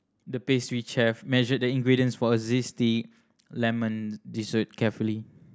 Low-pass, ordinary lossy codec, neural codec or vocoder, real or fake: none; none; none; real